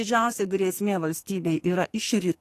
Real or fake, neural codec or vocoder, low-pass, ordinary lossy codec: fake; codec, 44.1 kHz, 2.6 kbps, SNAC; 14.4 kHz; AAC, 48 kbps